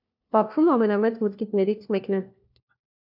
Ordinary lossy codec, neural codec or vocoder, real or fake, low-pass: AAC, 48 kbps; codec, 16 kHz, 1 kbps, FunCodec, trained on LibriTTS, 50 frames a second; fake; 5.4 kHz